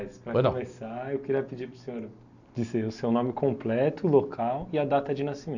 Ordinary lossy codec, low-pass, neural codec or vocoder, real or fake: none; 7.2 kHz; none; real